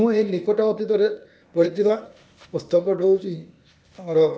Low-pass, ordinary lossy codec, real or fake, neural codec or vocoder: none; none; fake; codec, 16 kHz, 0.8 kbps, ZipCodec